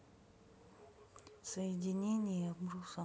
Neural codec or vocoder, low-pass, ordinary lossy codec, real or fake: none; none; none; real